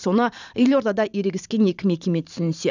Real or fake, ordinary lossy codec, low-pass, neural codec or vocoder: fake; none; 7.2 kHz; vocoder, 44.1 kHz, 128 mel bands every 512 samples, BigVGAN v2